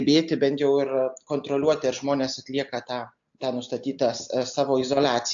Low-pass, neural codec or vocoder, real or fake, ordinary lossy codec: 7.2 kHz; none; real; AAC, 64 kbps